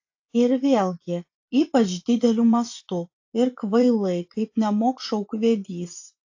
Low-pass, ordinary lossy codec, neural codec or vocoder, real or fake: 7.2 kHz; AAC, 48 kbps; vocoder, 24 kHz, 100 mel bands, Vocos; fake